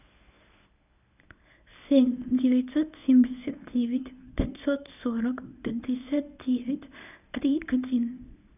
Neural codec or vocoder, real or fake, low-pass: codec, 24 kHz, 0.9 kbps, WavTokenizer, medium speech release version 1; fake; 3.6 kHz